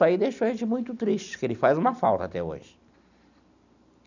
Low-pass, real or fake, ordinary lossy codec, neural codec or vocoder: 7.2 kHz; real; none; none